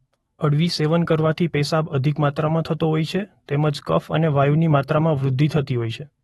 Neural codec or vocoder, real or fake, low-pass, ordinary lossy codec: autoencoder, 48 kHz, 128 numbers a frame, DAC-VAE, trained on Japanese speech; fake; 19.8 kHz; AAC, 32 kbps